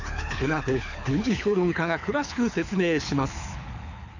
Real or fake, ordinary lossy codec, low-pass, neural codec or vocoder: fake; none; 7.2 kHz; codec, 24 kHz, 6 kbps, HILCodec